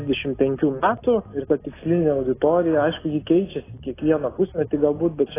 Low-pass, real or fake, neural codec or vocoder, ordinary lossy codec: 3.6 kHz; real; none; AAC, 16 kbps